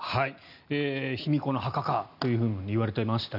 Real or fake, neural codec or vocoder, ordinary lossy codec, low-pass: real; none; none; 5.4 kHz